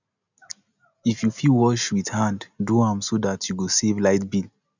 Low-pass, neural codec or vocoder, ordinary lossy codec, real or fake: 7.2 kHz; none; none; real